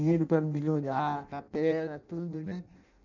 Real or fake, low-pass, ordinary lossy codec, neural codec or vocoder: fake; 7.2 kHz; none; codec, 16 kHz in and 24 kHz out, 0.6 kbps, FireRedTTS-2 codec